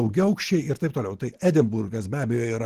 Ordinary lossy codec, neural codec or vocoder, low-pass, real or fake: Opus, 16 kbps; none; 14.4 kHz; real